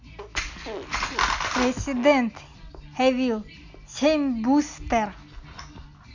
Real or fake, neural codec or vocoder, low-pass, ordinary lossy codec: real; none; 7.2 kHz; none